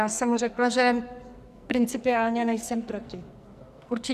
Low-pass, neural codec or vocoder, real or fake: 14.4 kHz; codec, 44.1 kHz, 2.6 kbps, SNAC; fake